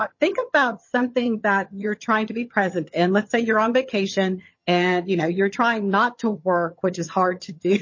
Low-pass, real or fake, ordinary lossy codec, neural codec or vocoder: 7.2 kHz; fake; MP3, 32 kbps; vocoder, 22.05 kHz, 80 mel bands, HiFi-GAN